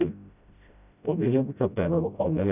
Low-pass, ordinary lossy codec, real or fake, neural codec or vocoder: 3.6 kHz; none; fake; codec, 16 kHz, 0.5 kbps, FreqCodec, smaller model